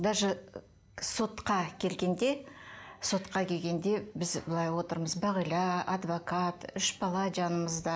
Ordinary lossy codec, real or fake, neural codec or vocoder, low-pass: none; real; none; none